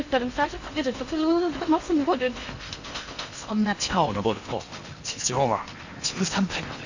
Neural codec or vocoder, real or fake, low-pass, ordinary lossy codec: codec, 16 kHz in and 24 kHz out, 0.8 kbps, FocalCodec, streaming, 65536 codes; fake; 7.2 kHz; Opus, 64 kbps